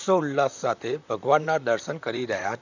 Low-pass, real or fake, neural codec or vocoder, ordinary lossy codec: 7.2 kHz; fake; vocoder, 44.1 kHz, 128 mel bands, Pupu-Vocoder; none